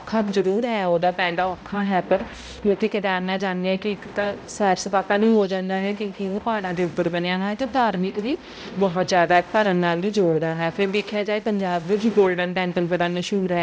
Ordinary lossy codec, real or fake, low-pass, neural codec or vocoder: none; fake; none; codec, 16 kHz, 0.5 kbps, X-Codec, HuBERT features, trained on balanced general audio